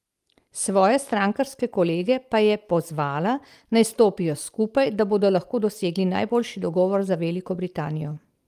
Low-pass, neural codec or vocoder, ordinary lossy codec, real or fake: 14.4 kHz; none; Opus, 32 kbps; real